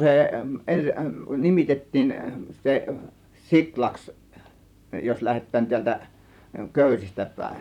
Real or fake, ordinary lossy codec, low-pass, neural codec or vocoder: fake; none; 19.8 kHz; vocoder, 44.1 kHz, 128 mel bands, Pupu-Vocoder